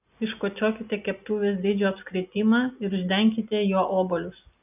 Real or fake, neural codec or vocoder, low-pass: real; none; 3.6 kHz